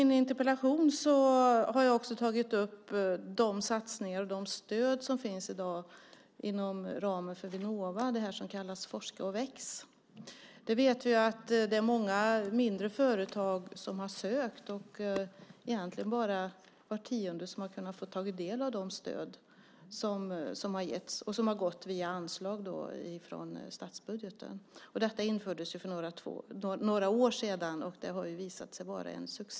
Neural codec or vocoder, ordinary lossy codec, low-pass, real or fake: none; none; none; real